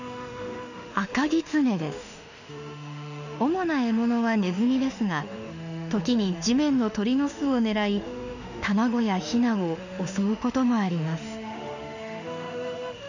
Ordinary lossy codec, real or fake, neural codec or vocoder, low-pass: none; fake; autoencoder, 48 kHz, 32 numbers a frame, DAC-VAE, trained on Japanese speech; 7.2 kHz